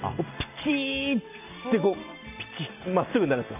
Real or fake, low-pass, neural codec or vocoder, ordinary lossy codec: real; 3.6 kHz; none; none